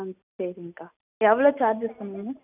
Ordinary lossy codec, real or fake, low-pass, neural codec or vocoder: none; real; 3.6 kHz; none